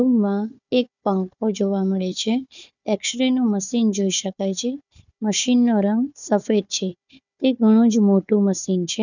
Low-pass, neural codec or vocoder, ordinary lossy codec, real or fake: 7.2 kHz; codec, 16 kHz, 4 kbps, FunCodec, trained on Chinese and English, 50 frames a second; none; fake